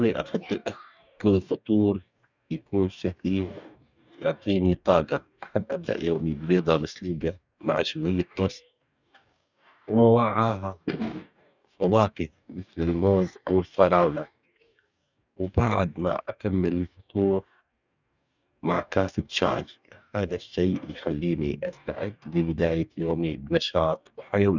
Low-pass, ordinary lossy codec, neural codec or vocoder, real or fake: 7.2 kHz; none; codec, 44.1 kHz, 2.6 kbps, DAC; fake